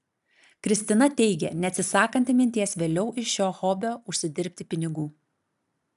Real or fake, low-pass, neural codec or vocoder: real; 14.4 kHz; none